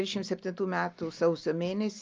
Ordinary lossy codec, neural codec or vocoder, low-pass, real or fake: Opus, 24 kbps; none; 7.2 kHz; real